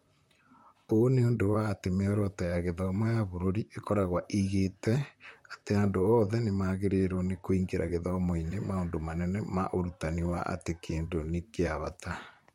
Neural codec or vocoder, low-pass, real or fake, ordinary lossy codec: vocoder, 44.1 kHz, 128 mel bands, Pupu-Vocoder; 19.8 kHz; fake; MP3, 64 kbps